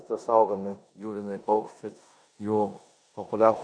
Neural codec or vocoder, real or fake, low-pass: codec, 16 kHz in and 24 kHz out, 0.9 kbps, LongCat-Audio-Codec, four codebook decoder; fake; 9.9 kHz